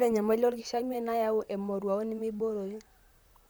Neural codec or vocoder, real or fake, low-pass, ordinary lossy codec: vocoder, 44.1 kHz, 128 mel bands, Pupu-Vocoder; fake; none; none